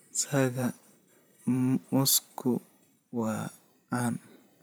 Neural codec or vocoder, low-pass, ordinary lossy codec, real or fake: vocoder, 44.1 kHz, 128 mel bands every 512 samples, BigVGAN v2; none; none; fake